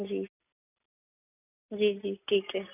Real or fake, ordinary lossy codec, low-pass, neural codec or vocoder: real; AAC, 24 kbps; 3.6 kHz; none